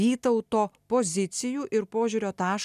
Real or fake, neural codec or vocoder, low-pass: real; none; 14.4 kHz